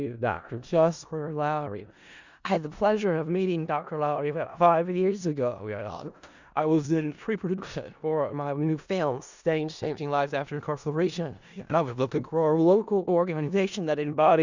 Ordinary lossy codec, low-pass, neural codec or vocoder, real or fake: Opus, 64 kbps; 7.2 kHz; codec, 16 kHz in and 24 kHz out, 0.4 kbps, LongCat-Audio-Codec, four codebook decoder; fake